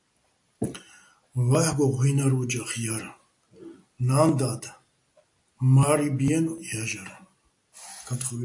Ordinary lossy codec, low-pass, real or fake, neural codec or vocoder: AAC, 64 kbps; 10.8 kHz; real; none